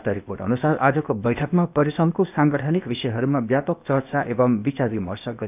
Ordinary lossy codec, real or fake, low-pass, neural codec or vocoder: none; fake; 3.6 kHz; codec, 16 kHz, 0.8 kbps, ZipCodec